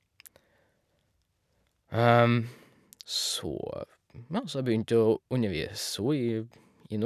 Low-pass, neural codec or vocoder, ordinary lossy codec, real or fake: 14.4 kHz; none; none; real